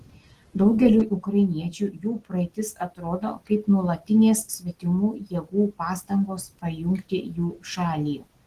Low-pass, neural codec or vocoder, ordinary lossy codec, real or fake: 14.4 kHz; none; Opus, 16 kbps; real